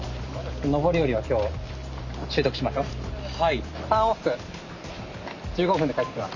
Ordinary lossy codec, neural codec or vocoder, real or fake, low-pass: none; none; real; 7.2 kHz